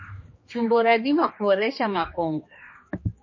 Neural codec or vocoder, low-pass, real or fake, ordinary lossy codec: codec, 16 kHz, 2 kbps, X-Codec, HuBERT features, trained on general audio; 7.2 kHz; fake; MP3, 32 kbps